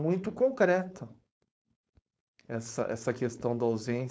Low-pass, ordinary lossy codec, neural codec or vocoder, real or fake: none; none; codec, 16 kHz, 4.8 kbps, FACodec; fake